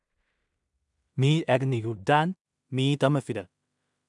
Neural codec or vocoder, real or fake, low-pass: codec, 16 kHz in and 24 kHz out, 0.4 kbps, LongCat-Audio-Codec, two codebook decoder; fake; 10.8 kHz